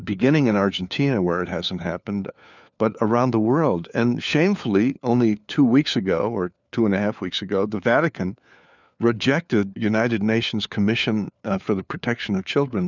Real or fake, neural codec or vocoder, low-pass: fake; codec, 16 kHz, 4 kbps, FunCodec, trained on LibriTTS, 50 frames a second; 7.2 kHz